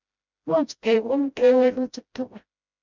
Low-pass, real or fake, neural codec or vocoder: 7.2 kHz; fake; codec, 16 kHz, 0.5 kbps, FreqCodec, smaller model